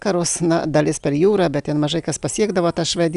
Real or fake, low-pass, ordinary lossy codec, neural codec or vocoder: real; 10.8 kHz; Opus, 64 kbps; none